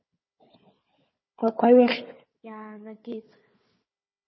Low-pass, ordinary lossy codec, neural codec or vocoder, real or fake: 7.2 kHz; MP3, 24 kbps; codec, 16 kHz, 4 kbps, FunCodec, trained on Chinese and English, 50 frames a second; fake